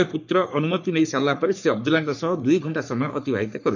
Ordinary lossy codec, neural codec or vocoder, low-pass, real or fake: none; codec, 44.1 kHz, 3.4 kbps, Pupu-Codec; 7.2 kHz; fake